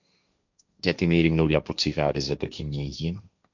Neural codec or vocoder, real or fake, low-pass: codec, 16 kHz, 1.1 kbps, Voila-Tokenizer; fake; 7.2 kHz